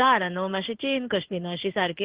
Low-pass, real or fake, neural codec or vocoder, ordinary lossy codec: 3.6 kHz; fake; codec, 16 kHz in and 24 kHz out, 1 kbps, XY-Tokenizer; Opus, 16 kbps